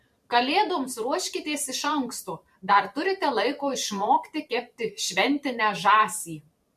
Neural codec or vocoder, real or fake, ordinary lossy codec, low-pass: vocoder, 44.1 kHz, 128 mel bands every 512 samples, BigVGAN v2; fake; MP3, 64 kbps; 14.4 kHz